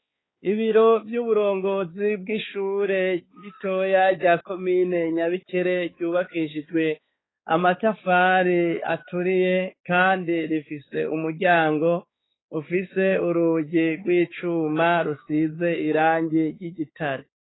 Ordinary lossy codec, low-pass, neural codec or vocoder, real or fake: AAC, 16 kbps; 7.2 kHz; codec, 16 kHz, 4 kbps, X-Codec, HuBERT features, trained on balanced general audio; fake